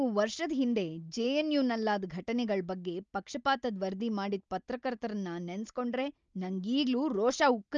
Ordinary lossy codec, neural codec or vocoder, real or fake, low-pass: Opus, 24 kbps; none; real; 7.2 kHz